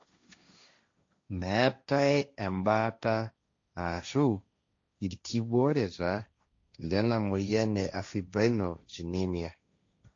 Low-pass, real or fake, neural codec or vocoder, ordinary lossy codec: 7.2 kHz; fake; codec, 16 kHz, 1.1 kbps, Voila-Tokenizer; AAC, 48 kbps